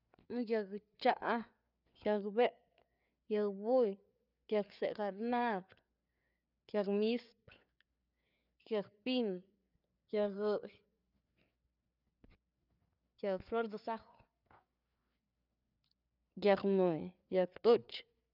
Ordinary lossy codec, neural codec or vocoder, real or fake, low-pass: none; codec, 16 kHz, 4 kbps, FreqCodec, larger model; fake; 5.4 kHz